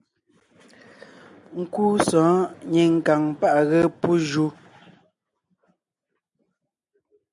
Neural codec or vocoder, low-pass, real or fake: none; 10.8 kHz; real